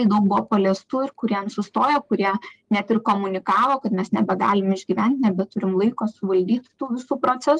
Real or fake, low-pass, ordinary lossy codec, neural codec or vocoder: real; 10.8 kHz; Opus, 24 kbps; none